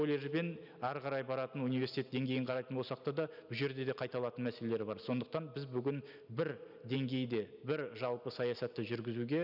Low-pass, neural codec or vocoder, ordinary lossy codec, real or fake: 5.4 kHz; none; none; real